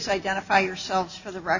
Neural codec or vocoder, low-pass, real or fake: none; 7.2 kHz; real